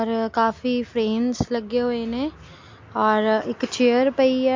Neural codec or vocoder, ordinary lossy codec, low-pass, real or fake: none; MP3, 48 kbps; 7.2 kHz; real